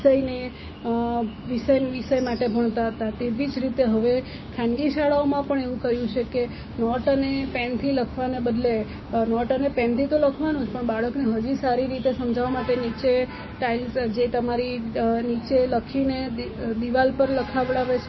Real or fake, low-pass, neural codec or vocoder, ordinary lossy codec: fake; 7.2 kHz; codec, 16 kHz, 6 kbps, DAC; MP3, 24 kbps